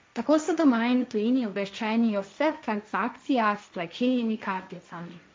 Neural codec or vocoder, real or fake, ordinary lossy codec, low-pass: codec, 16 kHz, 1.1 kbps, Voila-Tokenizer; fake; none; none